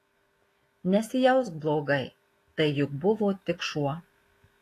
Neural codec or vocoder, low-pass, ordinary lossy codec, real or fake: autoencoder, 48 kHz, 128 numbers a frame, DAC-VAE, trained on Japanese speech; 14.4 kHz; AAC, 48 kbps; fake